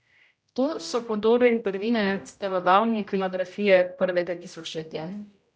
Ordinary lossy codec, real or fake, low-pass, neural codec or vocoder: none; fake; none; codec, 16 kHz, 0.5 kbps, X-Codec, HuBERT features, trained on general audio